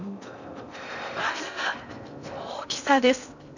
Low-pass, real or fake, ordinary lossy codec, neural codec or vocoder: 7.2 kHz; fake; none; codec, 16 kHz in and 24 kHz out, 0.6 kbps, FocalCodec, streaming, 4096 codes